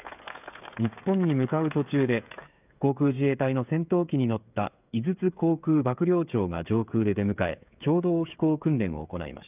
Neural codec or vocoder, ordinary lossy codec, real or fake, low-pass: codec, 16 kHz, 8 kbps, FreqCodec, smaller model; none; fake; 3.6 kHz